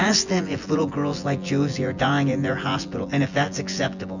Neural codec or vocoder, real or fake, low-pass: vocoder, 24 kHz, 100 mel bands, Vocos; fake; 7.2 kHz